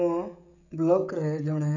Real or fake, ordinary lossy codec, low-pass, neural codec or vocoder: fake; none; 7.2 kHz; codec, 16 kHz, 16 kbps, FreqCodec, smaller model